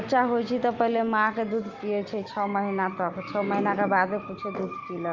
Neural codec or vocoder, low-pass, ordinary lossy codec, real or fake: none; 7.2 kHz; Opus, 24 kbps; real